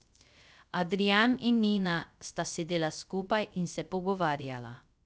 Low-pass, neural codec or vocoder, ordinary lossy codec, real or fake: none; codec, 16 kHz, 0.3 kbps, FocalCodec; none; fake